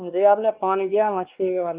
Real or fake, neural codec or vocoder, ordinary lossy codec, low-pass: fake; codec, 16 kHz, 2 kbps, X-Codec, WavLM features, trained on Multilingual LibriSpeech; Opus, 32 kbps; 3.6 kHz